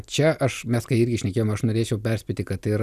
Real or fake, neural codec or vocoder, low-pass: real; none; 14.4 kHz